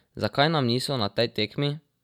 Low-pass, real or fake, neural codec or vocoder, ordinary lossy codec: 19.8 kHz; real; none; none